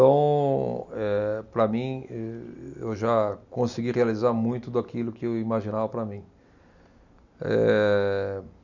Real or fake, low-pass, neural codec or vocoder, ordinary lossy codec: real; 7.2 kHz; none; none